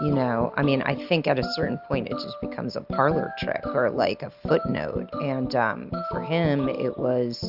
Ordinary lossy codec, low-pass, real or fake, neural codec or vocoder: Opus, 64 kbps; 5.4 kHz; real; none